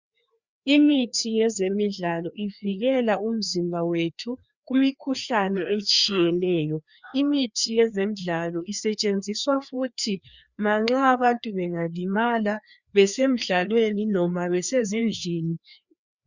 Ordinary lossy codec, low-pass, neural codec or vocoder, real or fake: Opus, 64 kbps; 7.2 kHz; codec, 16 kHz, 2 kbps, FreqCodec, larger model; fake